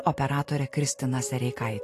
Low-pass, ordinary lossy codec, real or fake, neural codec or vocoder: 14.4 kHz; AAC, 48 kbps; real; none